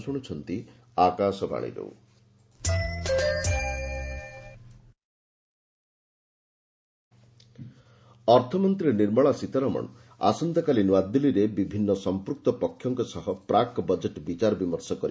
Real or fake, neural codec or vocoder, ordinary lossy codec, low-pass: real; none; none; none